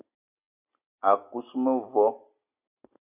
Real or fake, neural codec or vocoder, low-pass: real; none; 3.6 kHz